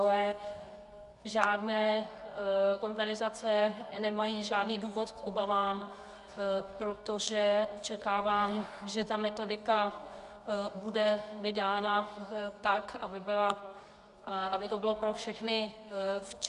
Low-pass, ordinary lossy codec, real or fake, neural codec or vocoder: 10.8 kHz; MP3, 96 kbps; fake; codec, 24 kHz, 0.9 kbps, WavTokenizer, medium music audio release